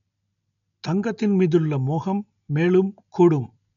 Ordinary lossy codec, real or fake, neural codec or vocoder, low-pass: none; real; none; 7.2 kHz